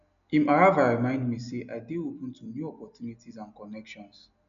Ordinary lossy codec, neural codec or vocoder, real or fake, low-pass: none; none; real; 7.2 kHz